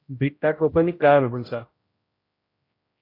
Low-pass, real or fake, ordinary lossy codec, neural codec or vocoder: 5.4 kHz; fake; AAC, 24 kbps; codec, 16 kHz, 0.5 kbps, X-Codec, HuBERT features, trained on general audio